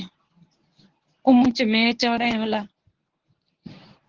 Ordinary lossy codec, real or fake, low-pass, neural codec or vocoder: Opus, 16 kbps; fake; 7.2 kHz; codec, 24 kHz, 0.9 kbps, WavTokenizer, medium speech release version 1